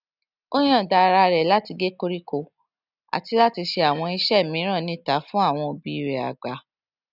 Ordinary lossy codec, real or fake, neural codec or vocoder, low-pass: none; real; none; 5.4 kHz